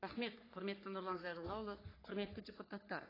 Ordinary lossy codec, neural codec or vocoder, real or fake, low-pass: AAC, 24 kbps; codec, 44.1 kHz, 3.4 kbps, Pupu-Codec; fake; 5.4 kHz